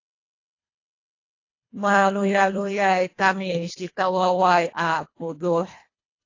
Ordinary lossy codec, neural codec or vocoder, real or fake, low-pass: AAC, 32 kbps; codec, 24 kHz, 1.5 kbps, HILCodec; fake; 7.2 kHz